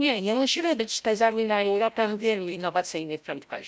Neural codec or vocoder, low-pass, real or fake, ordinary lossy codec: codec, 16 kHz, 0.5 kbps, FreqCodec, larger model; none; fake; none